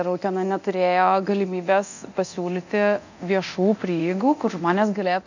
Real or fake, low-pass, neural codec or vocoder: fake; 7.2 kHz; codec, 24 kHz, 0.9 kbps, DualCodec